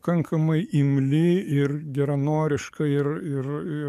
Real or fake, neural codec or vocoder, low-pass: fake; codec, 44.1 kHz, 7.8 kbps, DAC; 14.4 kHz